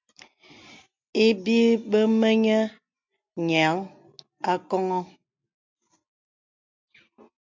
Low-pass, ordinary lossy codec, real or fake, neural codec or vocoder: 7.2 kHz; MP3, 64 kbps; real; none